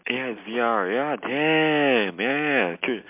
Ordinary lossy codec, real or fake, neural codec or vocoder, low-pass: MP3, 32 kbps; real; none; 3.6 kHz